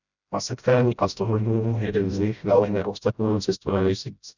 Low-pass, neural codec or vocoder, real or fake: 7.2 kHz; codec, 16 kHz, 0.5 kbps, FreqCodec, smaller model; fake